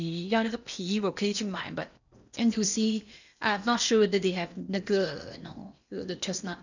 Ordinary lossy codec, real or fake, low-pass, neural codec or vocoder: none; fake; 7.2 kHz; codec, 16 kHz in and 24 kHz out, 0.8 kbps, FocalCodec, streaming, 65536 codes